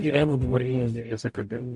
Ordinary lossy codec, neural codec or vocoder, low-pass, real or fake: MP3, 64 kbps; codec, 44.1 kHz, 0.9 kbps, DAC; 10.8 kHz; fake